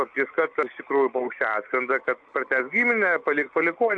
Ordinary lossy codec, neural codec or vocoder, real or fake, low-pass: AAC, 64 kbps; none; real; 9.9 kHz